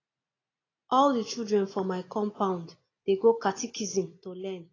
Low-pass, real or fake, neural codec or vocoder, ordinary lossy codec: 7.2 kHz; real; none; AAC, 32 kbps